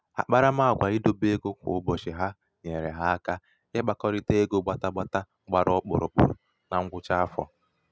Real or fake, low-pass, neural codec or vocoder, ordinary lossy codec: real; none; none; none